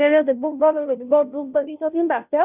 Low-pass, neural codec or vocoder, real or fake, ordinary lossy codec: 3.6 kHz; codec, 16 kHz, 0.5 kbps, FunCodec, trained on Chinese and English, 25 frames a second; fake; none